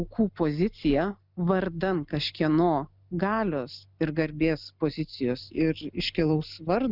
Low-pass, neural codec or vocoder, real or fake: 5.4 kHz; none; real